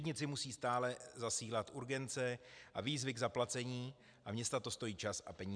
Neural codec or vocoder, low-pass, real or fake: none; 9.9 kHz; real